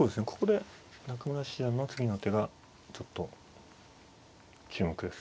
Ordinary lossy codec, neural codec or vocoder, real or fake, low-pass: none; none; real; none